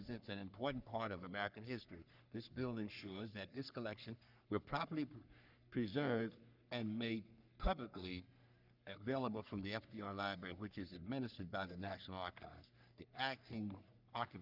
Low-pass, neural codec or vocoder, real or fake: 5.4 kHz; codec, 44.1 kHz, 3.4 kbps, Pupu-Codec; fake